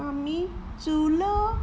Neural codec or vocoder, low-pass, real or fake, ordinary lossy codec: none; none; real; none